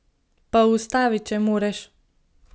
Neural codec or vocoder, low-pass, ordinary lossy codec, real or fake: none; none; none; real